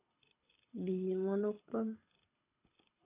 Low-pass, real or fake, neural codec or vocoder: 3.6 kHz; fake; codec, 24 kHz, 6 kbps, HILCodec